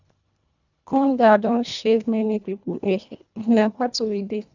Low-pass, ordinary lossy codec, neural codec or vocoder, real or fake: 7.2 kHz; none; codec, 24 kHz, 1.5 kbps, HILCodec; fake